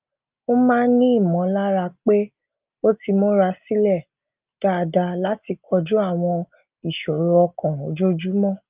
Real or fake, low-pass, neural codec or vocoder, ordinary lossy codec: real; 3.6 kHz; none; Opus, 24 kbps